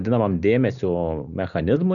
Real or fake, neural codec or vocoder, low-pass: real; none; 7.2 kHz